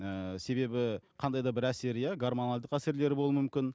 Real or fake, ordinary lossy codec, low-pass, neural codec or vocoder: real; none; none; none